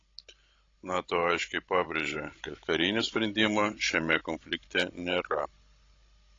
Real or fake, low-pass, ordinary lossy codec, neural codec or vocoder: fake; 7.2 kHz; AAC, 32 kbps; codec, 16 kHz, 16 kbps, FreqCodec, larger model